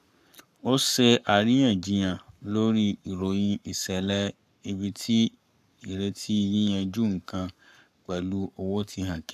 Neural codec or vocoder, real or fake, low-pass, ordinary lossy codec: codec, 44.1 kHz, 7.8 kbps, Pupu-Codec; fake; 14.4 kHz; none